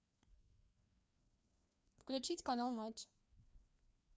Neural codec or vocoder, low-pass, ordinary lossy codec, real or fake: codec, 16 kHz, 2 kbps, FreqCodec, larger model; none; none; fake